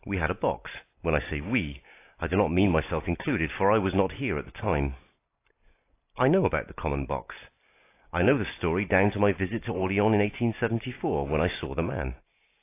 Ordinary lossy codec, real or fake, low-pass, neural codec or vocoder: AAC, 24 kbps; real; 3.6 kHz; none